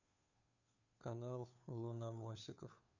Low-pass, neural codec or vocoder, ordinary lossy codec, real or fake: 7.2 kHz; codec, 16 kHz, 2 kbps, FunCodec, trained on Chinese and English, 25 frames a second; none; fake